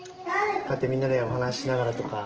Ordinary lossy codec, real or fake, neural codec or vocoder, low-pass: Opus, 16 kbps; real; none; 7.2 kHz